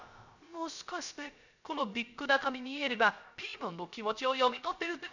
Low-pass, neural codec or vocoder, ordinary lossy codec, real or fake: 7.2 kHz; codec, 16 kHz, 0.3 kbps, FocalCodec; none; fake